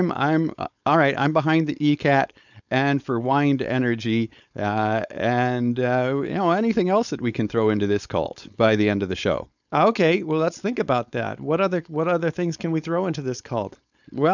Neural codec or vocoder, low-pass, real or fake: codec, 16 kHz, 4.8 kbps, FACodec; 7.2 kHz; fake